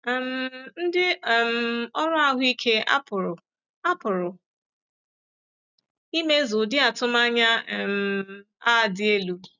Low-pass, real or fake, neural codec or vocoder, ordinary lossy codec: 7.2 kHz; real; none; none